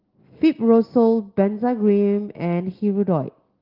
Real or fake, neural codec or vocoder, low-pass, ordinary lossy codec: real; none; 5.4 kHz; Opus, 16 kbps